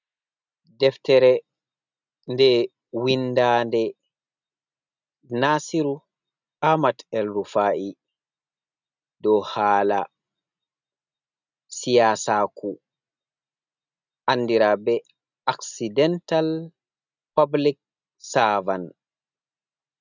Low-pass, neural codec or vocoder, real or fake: 7.2 kHz; none; real